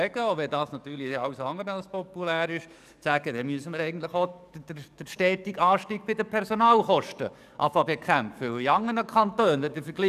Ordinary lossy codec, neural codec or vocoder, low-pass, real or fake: none; codec, 44.1 kHz, 7.8 kbps, DAC; 14.4 kHz; fake